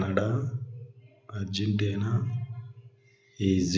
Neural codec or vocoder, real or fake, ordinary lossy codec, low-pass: none; real; none; none